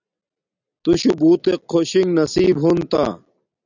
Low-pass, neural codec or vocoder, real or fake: 7.2 kHz; none; real